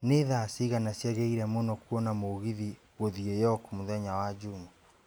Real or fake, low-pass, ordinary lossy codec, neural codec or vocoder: real; none; none; none